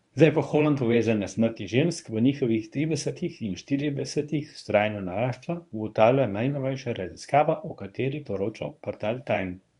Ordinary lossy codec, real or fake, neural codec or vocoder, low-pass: none; fake; codec, 24 kHz, 0.9 kbps, WavTokenizer, medium speech release version 1; 10.8 kHz